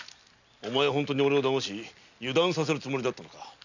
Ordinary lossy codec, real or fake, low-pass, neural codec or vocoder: none; real; 7.2 kHz; none